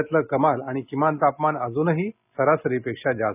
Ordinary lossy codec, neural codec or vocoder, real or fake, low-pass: none; none; real; 3.6 kHz